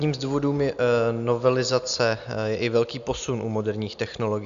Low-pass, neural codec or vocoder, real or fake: 7.2 kHz; none; real